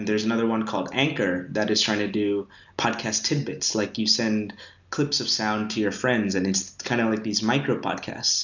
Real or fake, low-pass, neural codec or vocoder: real; 7.2 kHz; none